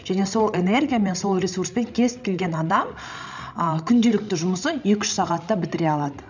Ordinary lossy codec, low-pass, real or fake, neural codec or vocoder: none; 7.2 kHz; fake; codec, 16 kHz, 16 kbps, FreqCodec, larger model